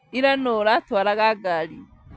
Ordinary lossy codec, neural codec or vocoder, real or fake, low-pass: none; none; real; none